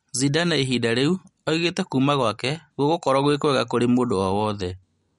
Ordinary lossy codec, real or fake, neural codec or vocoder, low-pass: MP3, 48 kbps; real; none; 19.8 kHz